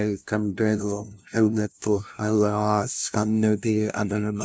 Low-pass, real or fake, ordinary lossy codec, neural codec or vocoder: none; fake; none; codec, 16 kHz, 0.5 kbps, FunCodec, trained on LibriTTS, 25 frames a second